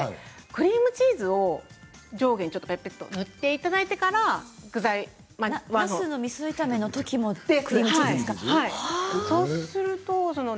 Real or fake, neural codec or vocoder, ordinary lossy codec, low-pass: real; none; none; none